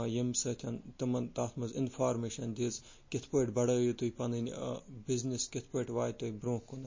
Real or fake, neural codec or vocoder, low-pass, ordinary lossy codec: real; none; 7.2 kHz; MP3, 32 kbps